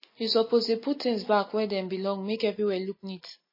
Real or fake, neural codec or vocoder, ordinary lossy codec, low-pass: real; none; MP3, 24 kbps; 5.4 kHz